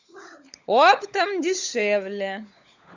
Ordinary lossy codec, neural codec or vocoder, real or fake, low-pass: Opus, 64 kbps; codec, 16 kHz, 16 kbps, FunCodec, trained on LibriTTS, 50 frames a second; fake; 7.2 kHz